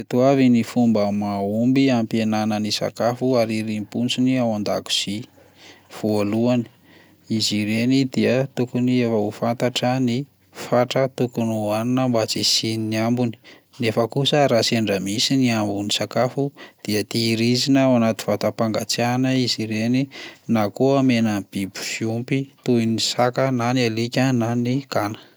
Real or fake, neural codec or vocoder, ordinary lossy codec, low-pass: real; none; none; none